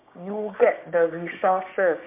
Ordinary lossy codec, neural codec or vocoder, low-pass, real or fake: MP3, 32 kbps; codec, 16 kHz, 6 kbps, DAC; 3.6 kHz; fake